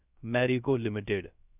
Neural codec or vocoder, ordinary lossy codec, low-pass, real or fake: codec, 16 kHz, 0.3 kbps, FocalCodec; none; 3.6 kHz; fake